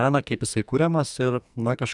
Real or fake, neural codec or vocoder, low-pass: fake; codec, 44.1 kHz, 2.6 kbps, SNAC; 10.8 kHz